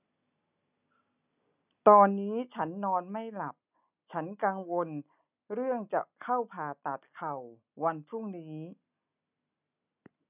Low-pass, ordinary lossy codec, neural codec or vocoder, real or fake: 3.6 kHz; none; none; real